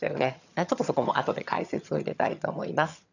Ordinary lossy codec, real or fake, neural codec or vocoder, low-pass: none; fake; vocoder, 22.05 kHz, 80 mel bands, HiFi-GAN; 7.2 kHz